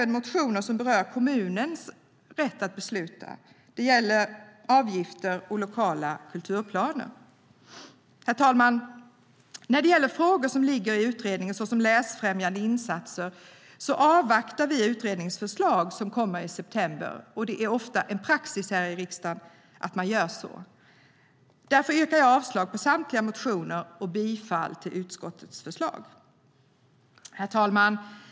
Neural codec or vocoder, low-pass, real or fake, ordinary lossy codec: none; none; real; none